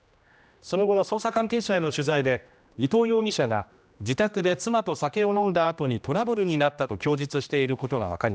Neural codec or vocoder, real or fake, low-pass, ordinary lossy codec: codec, 16 kHz, 1 kbps, X-Codec, HuBERT features, trained on general audio; fake; none; none